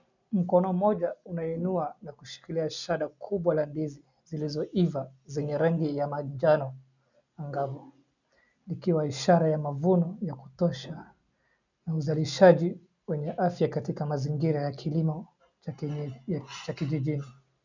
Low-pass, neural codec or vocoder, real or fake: 7.2 kHz; none; real